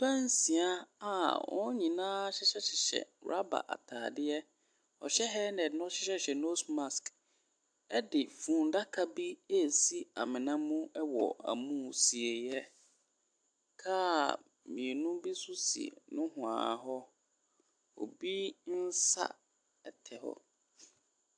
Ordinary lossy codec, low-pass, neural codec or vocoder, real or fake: AAC, 64 kbps; 9.9 kHz; none; real